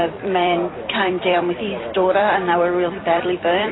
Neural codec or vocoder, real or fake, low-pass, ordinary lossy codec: vocoder, 44.1 kHz, 128 mel bands every 256 samples, BigVGAN v2; fake; 7.2 kHz; AAC, 16 kbps